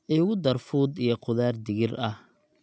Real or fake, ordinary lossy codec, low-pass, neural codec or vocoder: real; none; none; none